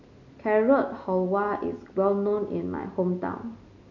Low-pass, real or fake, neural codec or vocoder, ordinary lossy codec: 7.2 kHz; real; none; none